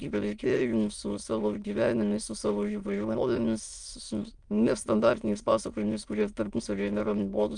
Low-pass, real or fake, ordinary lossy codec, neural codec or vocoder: 9.9 kHz; fake; Opus, 32 kbps; autoencoder, 22.05 kHz, a latent of 192 numbers a frame, VITS, trained on many speakers